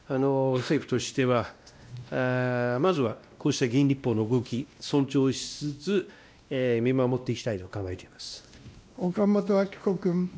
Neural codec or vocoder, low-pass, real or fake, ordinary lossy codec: codec, 16 kHz, 1 kbps, X-Codec, WavLM features, trained on Multilingual LibriSpeech; none; fake; none